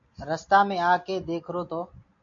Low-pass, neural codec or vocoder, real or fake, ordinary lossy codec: 7.2 kHz; none; real; MP3, 48 kbps